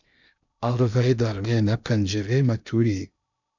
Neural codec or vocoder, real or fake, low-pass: codec, 16 kHz in and 24 kHz out, 0.6 kbps, FocalCodec, streaming, 2048 codes; fake; 7.2 kHz